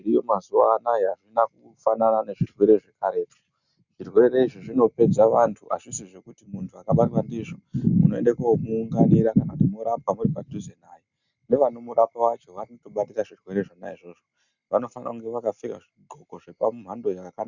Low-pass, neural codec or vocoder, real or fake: 7.2 kHz; none; real